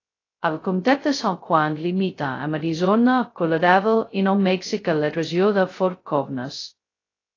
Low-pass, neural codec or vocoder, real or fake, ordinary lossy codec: 7.2 kHz; codec, 16 kHz, 0.2 kbps, FocalCodec; fake; AAC, 32 kbps